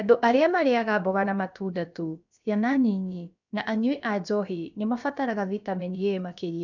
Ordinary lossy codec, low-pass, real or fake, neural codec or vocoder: none; 7.2 kHz; fake; codec, 16 kHz, about 1 kbps, DyCAST, with the encoder's durations